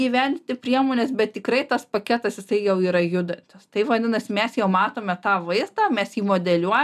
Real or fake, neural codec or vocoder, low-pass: real; none; 14.4 kHz